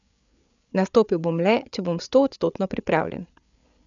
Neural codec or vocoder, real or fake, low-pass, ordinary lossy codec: codec, 16 kHz, 8 kbps, FreqCodec, larger model; fake; 7.2 kHz; none